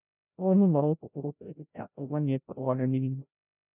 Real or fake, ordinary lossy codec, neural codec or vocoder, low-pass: fake; none; codec, 16 kHz, 0.5 kbps, FreqCodec, larger model; 3.6 kHz